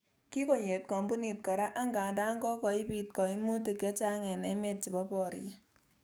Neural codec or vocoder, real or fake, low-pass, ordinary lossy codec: codec, 44.1 kHz, 7.8 kbps, DAC; fake; none; none